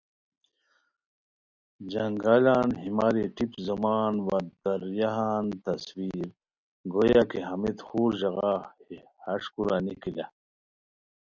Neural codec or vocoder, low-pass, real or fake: none; 7.2 kHz; real